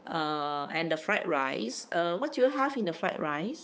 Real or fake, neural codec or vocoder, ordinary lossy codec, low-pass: fake; codec, 16 kHz, 4 kbps, X-Codec, HuBERT features, trained on balanced general audio; none; none